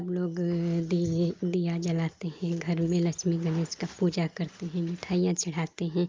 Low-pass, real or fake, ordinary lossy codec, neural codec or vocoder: 7.2 kHz; real; Opus, 32 kbps; none